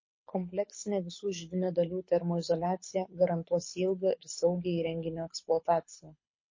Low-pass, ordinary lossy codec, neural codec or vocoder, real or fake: 7.2 kHz; MP3, 32 kbps; codec, 24 kHz, 6 kbps, HILCodec; fake